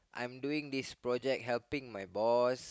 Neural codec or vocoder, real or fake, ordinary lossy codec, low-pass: none; real; none; none